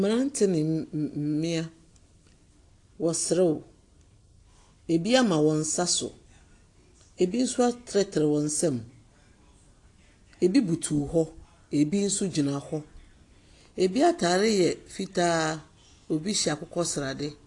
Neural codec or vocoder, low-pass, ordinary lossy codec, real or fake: none; 10.8 kHz; AAC, 48 kbps; real